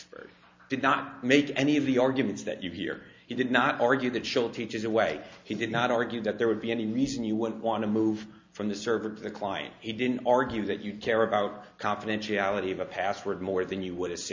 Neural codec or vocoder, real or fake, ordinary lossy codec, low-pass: none; real; MP3, 64 kbps; 7.2 kHz